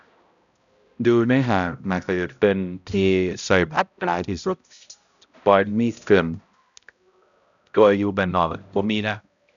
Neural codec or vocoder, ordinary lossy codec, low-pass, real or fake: codec, 16 kHz, 0.5 kbps, X-Codec, HuBERT features, trained on balanced general audio; none; 7.2 kHz; fake